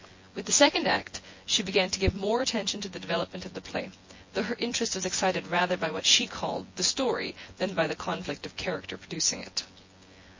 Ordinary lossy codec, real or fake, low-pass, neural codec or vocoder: MP3, 32 kbps; fake; 7.2 kHz; vocoder, 24 kHz, 100 mel bands, Vocos